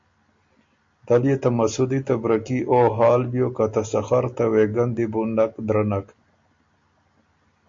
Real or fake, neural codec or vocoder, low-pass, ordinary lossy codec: real; none; 7.2 kHz; MP3, 48 kbps